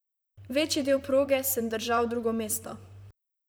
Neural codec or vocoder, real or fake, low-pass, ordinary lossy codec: vocoder, 44.1 kHz, 128 mel bands, Pupu-Vocoder; fake; none; none